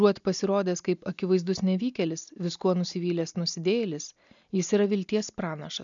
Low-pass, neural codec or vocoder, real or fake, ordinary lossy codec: 7.2 kHz; none; real; MP3, 96 kbps